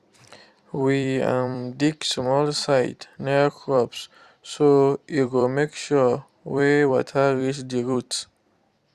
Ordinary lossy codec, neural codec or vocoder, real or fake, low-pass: Opus, 64 kbps; vocoder, 44.1 kHz, 128 mel bands every 256 samples, BigVGAN v2; fake; 14.4 kHz